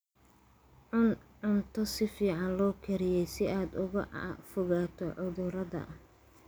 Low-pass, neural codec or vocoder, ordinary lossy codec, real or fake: none; none; none; real